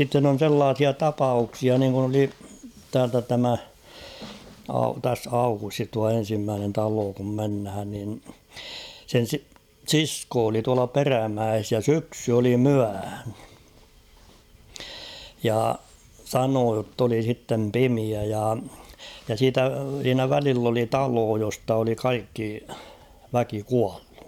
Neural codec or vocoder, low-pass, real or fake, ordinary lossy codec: vocoder, 44.1 kHz, 128 mel bands every 512 samples, BigVGAN v2; 19.8 kHz; fake; none